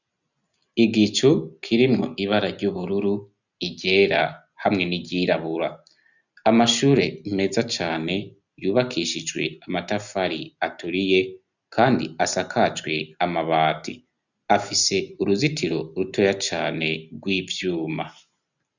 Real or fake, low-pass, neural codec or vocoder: real; 7.2 kHz; none